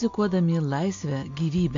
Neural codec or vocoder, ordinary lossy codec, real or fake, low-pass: none; AAC, 48 kbps; real; 7.2 kHz